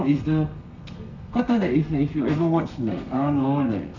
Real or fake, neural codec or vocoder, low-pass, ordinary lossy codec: fake; codec, 32 kHz, 1.9 kbps, SNAC; 7.2 kHz; none